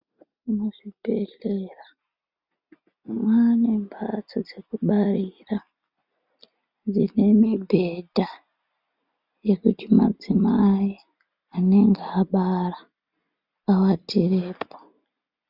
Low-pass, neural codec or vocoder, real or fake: 5.4 kHz; none; real